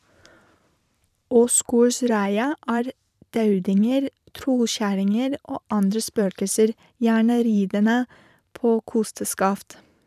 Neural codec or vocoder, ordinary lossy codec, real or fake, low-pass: vocoder, 44.1 kHz, 128 mel bands every 512 samples, BigVGAN v2; none; fake; 14.4 kHz